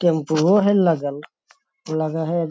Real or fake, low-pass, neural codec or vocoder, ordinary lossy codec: real; none; none; none